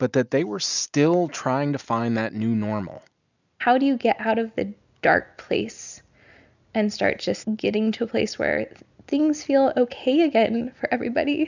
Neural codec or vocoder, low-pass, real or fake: none; 7.2 kHz; real